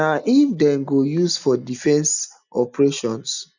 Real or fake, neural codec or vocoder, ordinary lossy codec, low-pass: real; none; none; 7.2 kHz